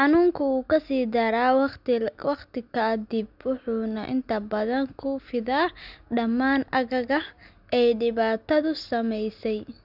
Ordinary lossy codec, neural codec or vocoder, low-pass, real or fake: none; none; 5.4 kHz; real